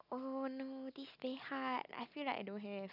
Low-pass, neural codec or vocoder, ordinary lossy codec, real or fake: 5.4 kHz; none; none; real